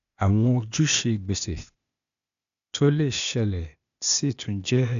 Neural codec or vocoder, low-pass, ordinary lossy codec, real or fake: codec, 16 kHz, 0.8 kbps, ZipCodec; 7.2 kHz; none; fake